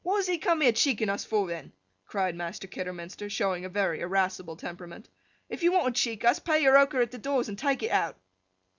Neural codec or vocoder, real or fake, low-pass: none; real; 7.2 kHz